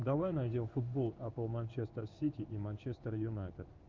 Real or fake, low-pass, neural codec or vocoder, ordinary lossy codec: fake; 7.2 kHz; codec, 16 kHz in and 24 kHz out, 1 kbps, XY-Tokenizer; Opus, 32 kbps